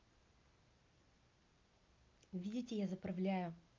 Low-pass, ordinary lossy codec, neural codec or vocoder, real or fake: 7.2 kHz; Opus, 24 kbps; none; real